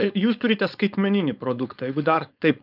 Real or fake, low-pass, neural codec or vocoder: fake; 5.4 kHz; codec, 16 kHz, 4.8 kbps, FACodec